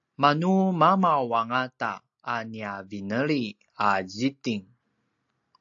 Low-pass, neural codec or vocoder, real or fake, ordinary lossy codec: 7.2 kHz; none; real; AAC, 64 kbps